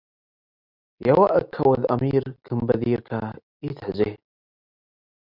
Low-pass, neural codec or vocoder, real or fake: 5.4 kHz; none; real